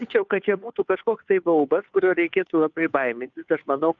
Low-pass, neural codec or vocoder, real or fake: 7.2 kHz; codec, 16 kHz, 2 kbps, FunCodec, trained on Chinese and English, 25 frames a second; fake